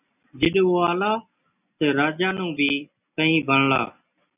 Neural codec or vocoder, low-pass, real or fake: none; 3.6 kHz; real